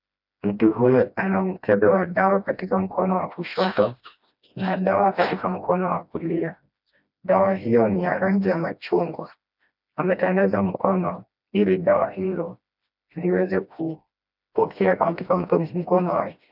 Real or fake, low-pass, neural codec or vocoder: fake; 5.4 kHz; codec, 16 kHz, 1 kbps, FreqCodec, smaller model